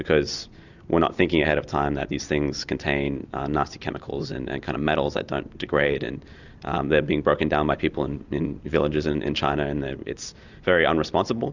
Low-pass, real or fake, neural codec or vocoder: 7.2 kHz; real; none